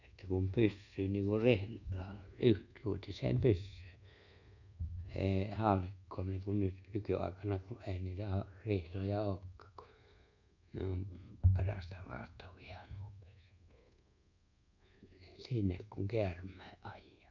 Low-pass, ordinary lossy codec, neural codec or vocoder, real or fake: 7.2 kHz; none; codec, 24 kHz, 1.2 kbps, DualCodec; fake